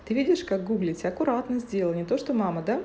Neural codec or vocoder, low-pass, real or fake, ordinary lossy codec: none; none; real; none